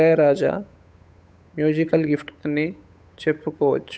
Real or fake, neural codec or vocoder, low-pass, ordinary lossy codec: fake; codec, 16 kHz, 8 kbps, FunCodec, trained on Chinese and English, 25 frames a second; none; none